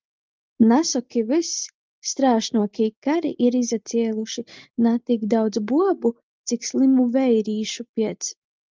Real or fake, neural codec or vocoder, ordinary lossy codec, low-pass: real; none; Opus, 24 kbps; 7.2 kHz